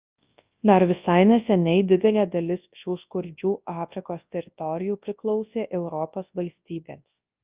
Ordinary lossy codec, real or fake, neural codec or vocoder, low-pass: Opus, 32 kbps; fake; codec, 24 kHz, 0.9 kbps, WavTokenizer, large speech release; 3.6 kHz